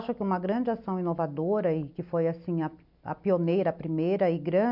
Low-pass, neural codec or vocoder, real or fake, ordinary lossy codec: 5.4 kHz; none; real; none